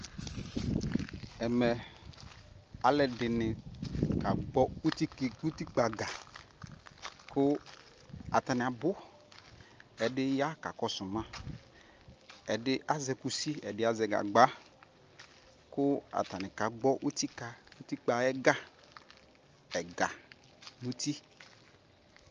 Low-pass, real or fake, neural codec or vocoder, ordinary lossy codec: 7.2 kHz; real; none; Opus, 24 kbps